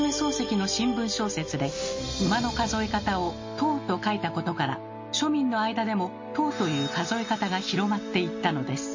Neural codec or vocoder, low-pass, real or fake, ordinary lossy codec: none; 7.2 kHz; real; MP3, 32 kbps